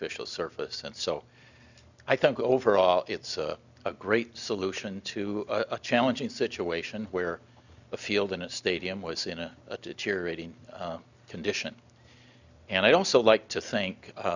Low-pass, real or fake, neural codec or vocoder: 7.2 kHz; real; none